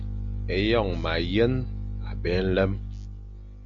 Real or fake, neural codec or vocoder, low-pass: real; none; 7.2 kHz